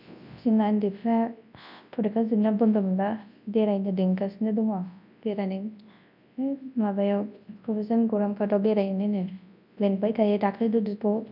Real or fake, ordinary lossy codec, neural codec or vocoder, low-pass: fake; none; codec, 24 kHz, 0.9 kbps, WavTokenizer, large speech release; 5.4 kHz